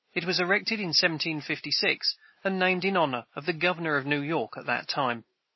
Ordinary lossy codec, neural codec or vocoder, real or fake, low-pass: MP3, 24 kbps; none; real; 7.2 kHz